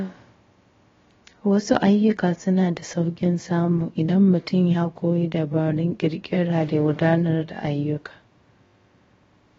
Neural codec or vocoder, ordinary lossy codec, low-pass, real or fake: codec, 16 kHz, about 1 kbps, DyCAST, with the encoder's durations; AAC, 24 kbps; 7.2 kHz; fake